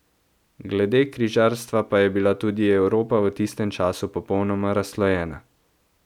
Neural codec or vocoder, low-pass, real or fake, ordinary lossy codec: none; 19.8 kHz; real; none